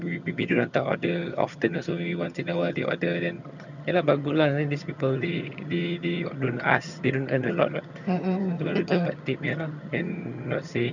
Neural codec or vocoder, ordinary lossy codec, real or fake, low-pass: vocoder, 22.05 kHz, 80 mel bands, HiFi-GAN; none; fake; 7.2 kHz